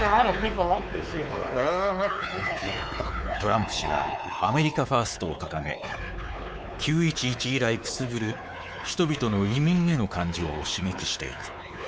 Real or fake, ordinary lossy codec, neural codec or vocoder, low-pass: fake; none; codec, 16 kHz, 4 kbps, X-Codec, WavLM features, trained on Multilingual LibriSpeech; none